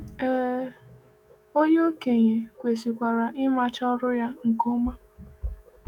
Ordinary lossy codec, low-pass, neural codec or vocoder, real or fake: none; 19.8 kHz; autoencoder, 48 kHz, 128 numbers a frame, DAC-VAE, trained on Japanese speech; fake